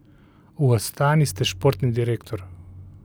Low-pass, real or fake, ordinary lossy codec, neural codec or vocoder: none; real; none; none